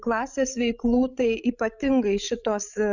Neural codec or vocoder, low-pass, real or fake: codec, 16 kHz, 16 kbps, FreqCodec, larger model; 7.2 kHz; fake